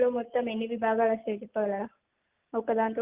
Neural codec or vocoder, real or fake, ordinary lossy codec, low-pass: none; real; Opus, 24 kbps; 3.6 kHz